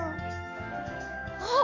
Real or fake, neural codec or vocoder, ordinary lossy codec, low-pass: fake; codec, 44.1 kHz, 7.8 kbps, DAC; none; 7.2 kHz